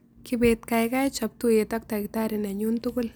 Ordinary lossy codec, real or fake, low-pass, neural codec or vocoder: none; real; none; none